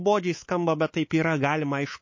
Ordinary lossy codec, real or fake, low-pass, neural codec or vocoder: MP3, 32 kbps; fake; 7.2 kHz; codec, 24 kHz, 3.1 kbps, DualCodec